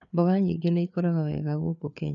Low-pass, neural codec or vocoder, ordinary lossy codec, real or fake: 7.2 kHz; codec, 16 kHz, 4 kbps, FreqCodec, larger model; none; fake